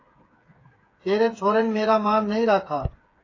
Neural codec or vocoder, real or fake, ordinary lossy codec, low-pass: codec, 16 kHz, 8 kbps, FreqCodec, smaller model; fake; AAC, 32 kbps; 7.2 kHz